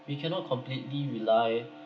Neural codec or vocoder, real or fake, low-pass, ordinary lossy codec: none; real; none; none